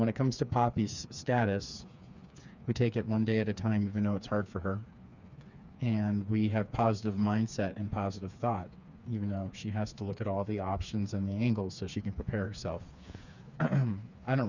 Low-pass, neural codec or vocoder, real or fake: 7.2 kHz; codec, 16 kHz, 4 kbps, FreqCodec, smaller model; fake